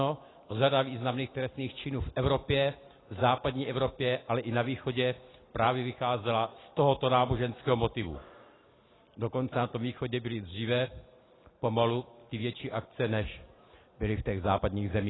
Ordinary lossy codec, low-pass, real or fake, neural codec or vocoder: AAC, 16 kbps; 7.2 kHz; real; none